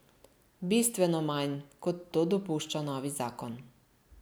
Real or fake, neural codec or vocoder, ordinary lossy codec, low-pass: real; none; none; none